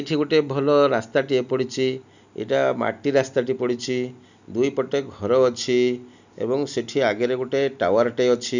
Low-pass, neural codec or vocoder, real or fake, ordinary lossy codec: 7.2 kHz; none; real; none